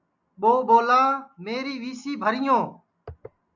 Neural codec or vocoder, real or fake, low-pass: none; real; 7.2 kHz